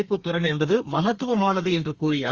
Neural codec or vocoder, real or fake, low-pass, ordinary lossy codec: codec, 32 kHz, 1.9 kbps, SNAC; fake; 7.2 kHz; Opus, 32 kbps